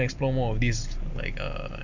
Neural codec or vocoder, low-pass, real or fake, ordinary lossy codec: none; 7.2 kHz; real; none